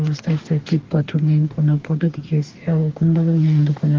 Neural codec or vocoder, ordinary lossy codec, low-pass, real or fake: codec, 44.1 kHz, 2.6 kbps, DAC; Opus, 16 kbps; 7.2 kHz; fake